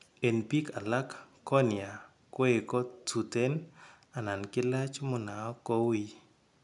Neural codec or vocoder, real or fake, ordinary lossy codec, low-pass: none; real; none; 10.8 kHz